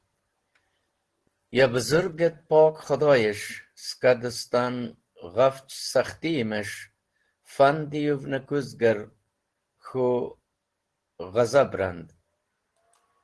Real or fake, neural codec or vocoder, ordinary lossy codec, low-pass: real; none; Opus, 16 kbps; 10.8 kHz